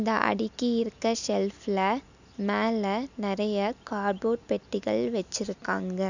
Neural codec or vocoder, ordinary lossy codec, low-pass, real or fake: none; none; 7.2 kHz; real